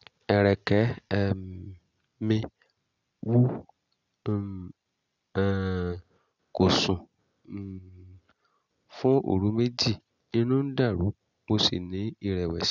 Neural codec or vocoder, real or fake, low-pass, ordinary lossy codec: none; real; 7.2 kHz; none